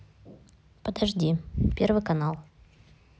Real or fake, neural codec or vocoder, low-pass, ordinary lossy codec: real; none; none; none